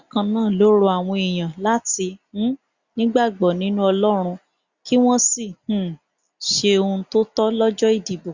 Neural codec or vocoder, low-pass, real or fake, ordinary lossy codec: none; 7.2 kHz; real; Opus, 64 kbps